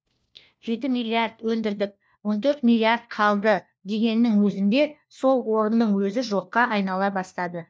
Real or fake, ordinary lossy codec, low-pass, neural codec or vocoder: fake; none; none; codec, 16 kHz, 1 kbps, FunCodec, trained on LibriTTS, 50 frames a second